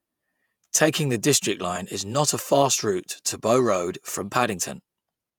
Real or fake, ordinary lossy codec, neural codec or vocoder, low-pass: fake; none; vocoder, 48 kHz, 128 mel bands, Vocos; none